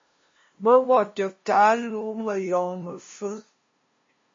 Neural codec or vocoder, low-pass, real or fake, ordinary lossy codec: codec, 16 kHz, 0.5 kbps, FunCodec, trained on LibriTTS, 25 frames a second; 7.2 kHz; fake; MP3, 32 kbps